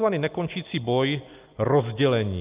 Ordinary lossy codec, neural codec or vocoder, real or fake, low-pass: Opus, 24 kbps; none; real; 3.6 kHz